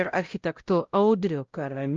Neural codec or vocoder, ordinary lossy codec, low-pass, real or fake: codec, 16 kHz, 0.5 kbps, X-Codec, WavLM features, trained on Multilingual LibriSpeech; Opus, 24 kbps; 7.2 kHz; fake